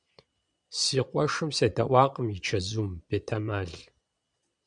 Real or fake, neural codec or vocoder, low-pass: fake; vocoder, 22.05 kHz, 80 mel bands, Vocos; 9.9 kHz